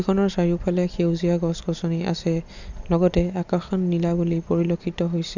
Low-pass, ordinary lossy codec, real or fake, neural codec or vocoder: 7.2 kHz; none; real; none